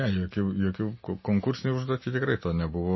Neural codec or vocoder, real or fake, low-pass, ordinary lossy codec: none; real; 7.2 kHz; MP3, 24 kbps